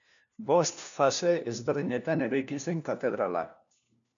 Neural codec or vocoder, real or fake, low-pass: codec, 16 kHz, 1 kbps, FunCodec, trained on LibriTTS, 50 frames a second; fake; 7.2 kHz